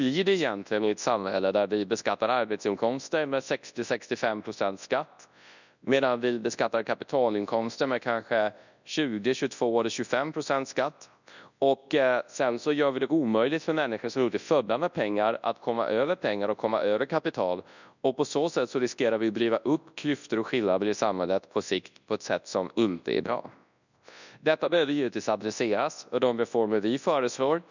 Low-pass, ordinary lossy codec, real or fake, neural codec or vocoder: 7.2 kHz; none; fake; codec, 24 kHz, 0.9 kbps, WavTokenizer, large speech release